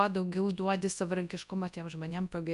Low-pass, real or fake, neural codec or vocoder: 10.8 kHz; fake; codec, 24 kHz, 0.9 kbps, WavTokenizer, large speech release